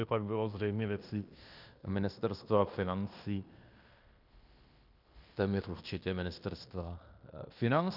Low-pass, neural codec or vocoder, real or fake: 5.4 kHz; codec, 16 kHz in and 24 kHz out, 0.9 kbps, LongCat-Audio-Codec, fine tuned four codebook decoder; fake